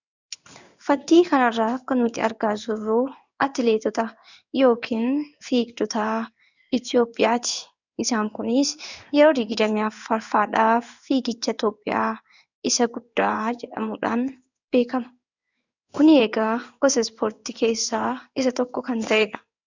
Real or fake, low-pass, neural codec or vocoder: fake; 7.2 kHz; codec, 16 kHz in and 24 kHz out, 1 kbps, XY-Tokenizer